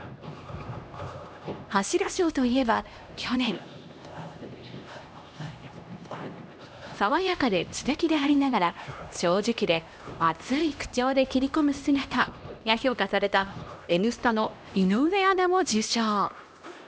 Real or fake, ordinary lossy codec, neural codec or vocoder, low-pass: fake; none; codec, 16 kHz, 1 kbps, X-Codec, HuBERT features, trained on LibriSpeech; none